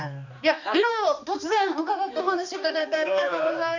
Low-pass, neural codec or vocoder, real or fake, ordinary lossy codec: 7.2 kHz; codec, 16 kHz, 2 kbps, X-Codec, HuBERT features, trained on balanced general audio; fake; none